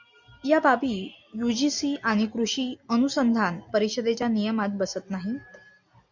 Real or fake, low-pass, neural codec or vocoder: real; 7.2 kHz; none